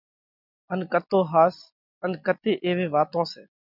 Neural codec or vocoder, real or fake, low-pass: none; real; 5.4 kHz